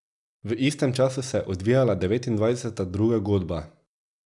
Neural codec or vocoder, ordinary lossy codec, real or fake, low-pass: none; none; real; 10.8 kHz